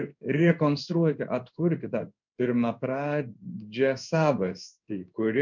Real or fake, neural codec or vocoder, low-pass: fake; codec, 16 kHz in and 24 kHz out, 1 kbps, XY-Tokenizer; 7.2 kHz